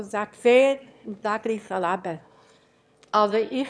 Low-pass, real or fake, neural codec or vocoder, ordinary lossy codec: none; fake; autoencoder, 22.05 kHz, a latent of 192 numbers a frame, VITS, trained on one speaker; none